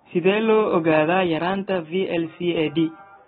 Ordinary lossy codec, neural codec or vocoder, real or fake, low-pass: AAC, 16 kbps; none; real; 7.2 kHz